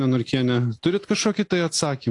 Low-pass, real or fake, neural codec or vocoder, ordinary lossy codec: 10.8 kHz; real; none; AAC, 48 kbps